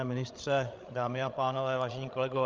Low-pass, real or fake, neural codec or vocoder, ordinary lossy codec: 7.2 kHz; fake; codec, 16 kHz, 16 kbps, FreqCodec, larger model; Opus, 24 kbps